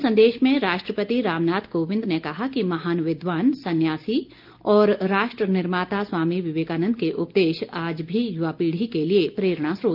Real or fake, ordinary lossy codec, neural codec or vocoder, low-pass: real; Opus, 32 kbps; none; 5.4 kHz